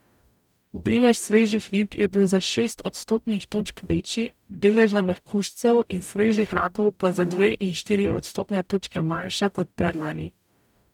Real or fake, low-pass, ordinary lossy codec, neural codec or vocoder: fake; 19.8 kHz; none; codec, 44.1 kHz, 0.9 kbps, DAC